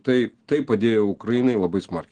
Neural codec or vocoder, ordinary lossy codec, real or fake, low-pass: none; Opus, 16 kbps; real; 9.9 kHz